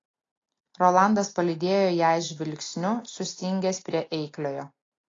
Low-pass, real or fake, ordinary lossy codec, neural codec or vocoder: 7.2 kHz; real; AAC, 32 kbps; none